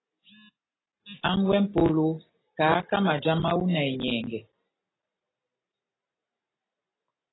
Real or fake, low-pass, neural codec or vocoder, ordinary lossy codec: real; 7.2 kHz; none; AAC, 16 kbps